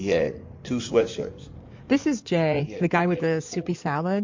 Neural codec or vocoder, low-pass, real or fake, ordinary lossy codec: codec, 16 kHz in and 24 kHz out, 2.2 kbps, FireRedTTS-2 codec; 7.2 kHz; fake; MP3, 48 kbps